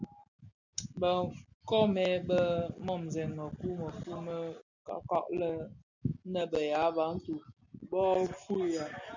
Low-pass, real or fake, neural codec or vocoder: 7.2 kHz; real; none